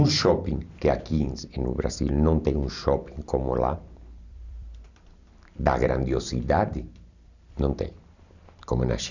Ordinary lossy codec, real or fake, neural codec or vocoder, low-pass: none; fake; vocoder, 44.1 kHz, 128 mel bands every 256 samples, BigVGAN v2; 7.2 kHz